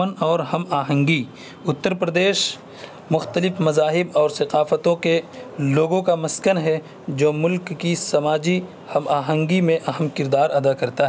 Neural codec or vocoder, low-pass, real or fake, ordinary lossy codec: none; none; real; none